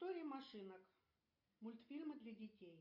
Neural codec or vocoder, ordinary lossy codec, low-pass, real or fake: none; MP3, 48 kbps; 5.4 kHz; real